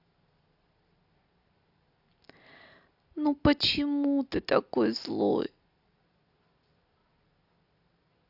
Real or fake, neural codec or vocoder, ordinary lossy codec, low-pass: real; none; AAC, 48 kbps; 5.4 kHz